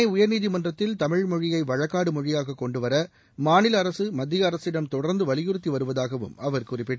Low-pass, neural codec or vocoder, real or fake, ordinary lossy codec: none; none; real; none